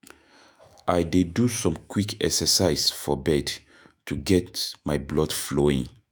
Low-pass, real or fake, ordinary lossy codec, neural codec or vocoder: none; fake; none; autoencoder, 48 kHz, 128 numbers a frame, DAC-VAE, trained on Japanese speech